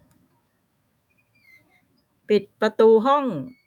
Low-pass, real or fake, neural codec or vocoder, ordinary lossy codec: 19.8 kHz; fake; autoencoder, 48 kHz, 128 numbers a frame, DAC-VAE, trained on Japanese speech; none